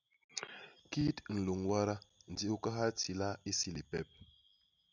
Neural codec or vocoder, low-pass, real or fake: none; 7.2 kHz; real